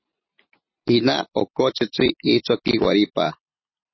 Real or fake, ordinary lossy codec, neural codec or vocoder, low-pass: real; MP3, 24 kbps; none; 7.2 kHz